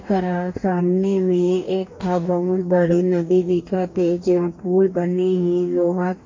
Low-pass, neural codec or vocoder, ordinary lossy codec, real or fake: 7.2 kHz; codec, 44.1 kHz, 2.6 kbps, DAC; MP3, 48 kbps; fake